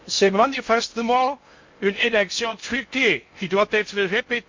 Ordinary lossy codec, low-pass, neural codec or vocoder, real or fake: MP3, 48 kbps; 7.2 kHz; codec, 16 kHz in and 24 kHz out, 0.6 kbps, FocalCodec, streaming, 2048 codes; fake